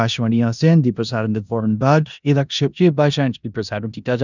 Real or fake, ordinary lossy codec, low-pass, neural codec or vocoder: fake; none; 7.2 kHz; codec, 16 kHz in and 24 kHz out, 0.9 kbps, LongCat-Audio-Codec, four codebook decoder